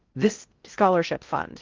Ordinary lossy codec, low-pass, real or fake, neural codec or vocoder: Opus, 24 kbps; 7.2 kHz; fake; codec, 16 kHz in and 24 kHz out, 0.6 kbps, FocalCodec, streaming, 4096 codes